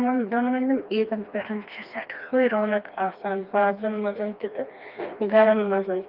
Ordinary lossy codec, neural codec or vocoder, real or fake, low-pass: Opus, 24 kbps; codec, 16 kHz, 2 kbps, FreqCodec, smaller model; fake; 5.4 kHz